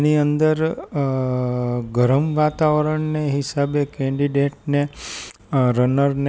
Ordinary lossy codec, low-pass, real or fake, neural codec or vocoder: none; none; real; none